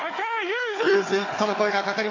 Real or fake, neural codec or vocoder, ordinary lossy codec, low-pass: fake; codec, 16 kHz, 4 kbps, FunCodec, trained on Chinese and English, 50 frames a second; AAC, 32 kbps; 7.2 kHz